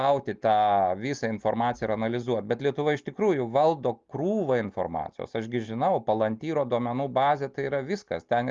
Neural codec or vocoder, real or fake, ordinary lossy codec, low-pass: none; real; Opus, 32 kbps; 7.2 kHz